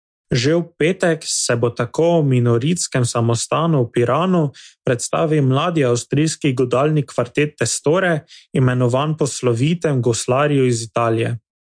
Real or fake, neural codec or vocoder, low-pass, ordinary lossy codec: real; none; 9.9 kHz; MP3, 64 kbps